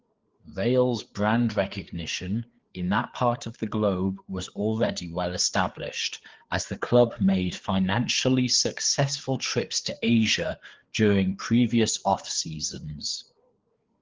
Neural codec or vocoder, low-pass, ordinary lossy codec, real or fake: codec, 16 kHz, 4 kbps, FreqCodec, larger model; 7.2 kHz; Opus, 16 kbps; fake